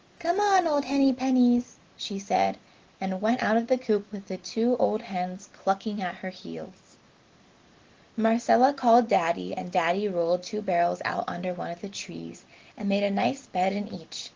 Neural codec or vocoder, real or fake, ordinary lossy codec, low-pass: none; real; Opus, 16 kbps; 7.2 kHz